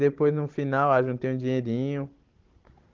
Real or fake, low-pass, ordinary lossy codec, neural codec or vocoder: fake; 7.2 kHz; Opus, 16 kbps; codec, 16 kHz, 16 kbps, FunCodec, trained on Chinese and English, 50 frames a second